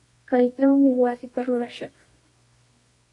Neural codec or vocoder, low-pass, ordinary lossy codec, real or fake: codec, 24 kHz, 0.9 kbps, WavTokenizer, medium music audio release; 10.8 kHz; AAC, 48 kbps; fake